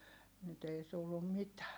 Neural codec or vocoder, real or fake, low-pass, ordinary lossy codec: none; real; none; none